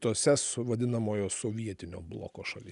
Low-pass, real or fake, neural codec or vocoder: 10.8 kHz; real; none